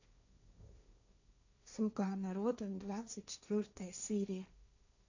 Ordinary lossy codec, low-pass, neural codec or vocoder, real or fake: none; 7.2 kHz; codec, 16 kHz, 1.1 kbps, Voila-Tokenizer; fake